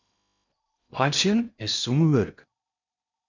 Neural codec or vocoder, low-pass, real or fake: codec, 16 kHz in and 24 kHz out, 0.8 kbps, FocalCodec, streaming, 65536 codes; 7.2 kHz; fake